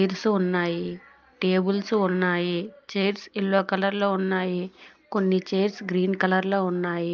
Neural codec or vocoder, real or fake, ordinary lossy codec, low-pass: none; real; Opus, 24 kbps; 7.2 kHz